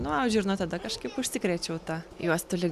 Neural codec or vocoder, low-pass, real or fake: none; 14.4 kHz; real